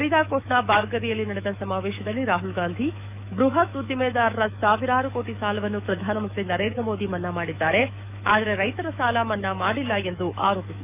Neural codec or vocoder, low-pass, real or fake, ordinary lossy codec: autoencoder, 48 kHz, 128 numbers a frame, DAC-VAE, trained on Japanese speech; 3.6 kHz; fake; none